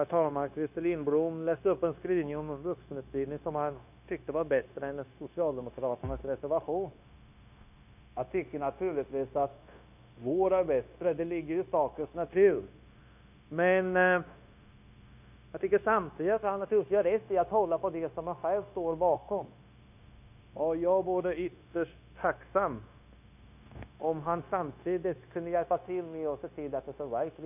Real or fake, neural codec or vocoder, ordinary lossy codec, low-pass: fake; codec, 16 kHz, 0.9 kbps, LongCat-Audio-Codec; none; 3.6 kHz